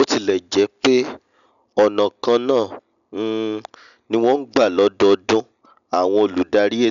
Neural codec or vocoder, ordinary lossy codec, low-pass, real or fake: none; MP3, 96 kbps; 7.2 kHz; real